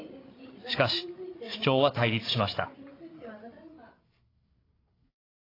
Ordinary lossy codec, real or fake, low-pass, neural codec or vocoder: AAC, 24 kbps; fake; 5.4 kHz; codec, 16 kHz, 8 kbps, FreqCodec, larger model